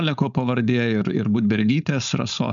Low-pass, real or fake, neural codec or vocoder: 7.2 kHz; fake; codec, 16 kHz, 4.8 kbps, FACodec